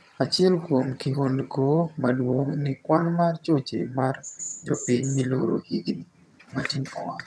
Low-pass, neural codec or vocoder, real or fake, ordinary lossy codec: none; vocoder, 22.05 kHz, 80 mel bands, HiFi-GAN; fake; none